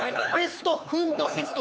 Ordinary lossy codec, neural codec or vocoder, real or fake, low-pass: none; codec, 16 kHz, 4 kbps, X-Codec, HuBERT features, trained on LibriSpeech; fake; none